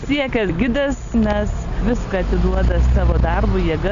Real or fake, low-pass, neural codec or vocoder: real; 7.2 kHz; none